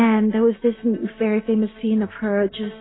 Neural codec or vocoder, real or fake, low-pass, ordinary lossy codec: none; real; 7.2 kHz; AAC, 16 kbps